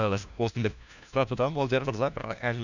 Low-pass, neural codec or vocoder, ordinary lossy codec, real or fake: 7.2 kHz; codec, 16 kHz, 1 kbps, FunCodec, trained on LibriTTS, 50 frames a second; none; fake